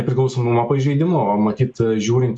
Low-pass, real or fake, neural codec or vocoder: 9.9 kHz; real; none